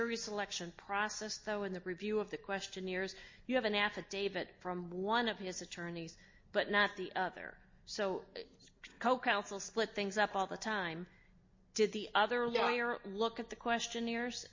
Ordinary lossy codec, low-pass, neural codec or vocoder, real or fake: MP3, 32 kbps; 7.2 kHz; none; real